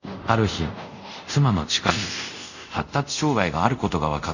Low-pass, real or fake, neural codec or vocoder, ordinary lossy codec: 7.2 kHz; fake; codec, 24 kHz, 0.5 kbps, DualCodec; none